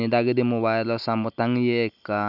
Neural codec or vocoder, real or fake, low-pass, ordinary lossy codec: none; real; 5.4 kHz; none